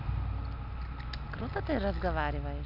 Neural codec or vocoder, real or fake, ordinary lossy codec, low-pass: none; real; none; 5.4 kHz